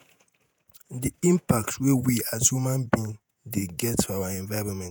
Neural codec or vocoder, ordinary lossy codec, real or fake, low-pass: vocoder, 48 kHz, 128 mel bands, Vocos; none; fake; none